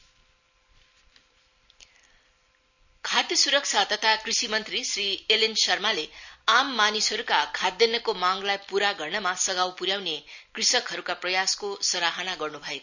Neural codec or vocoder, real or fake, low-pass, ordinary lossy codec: none; real; 7.2 kHz; none